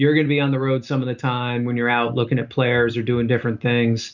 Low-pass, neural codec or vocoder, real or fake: 7.2 kHz; none; real